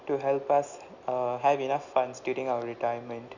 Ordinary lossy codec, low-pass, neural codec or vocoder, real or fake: none; 7.2 kHz; none; real